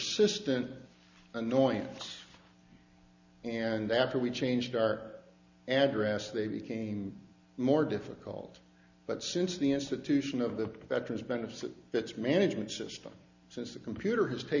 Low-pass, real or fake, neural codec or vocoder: 7.2 kHz; real; none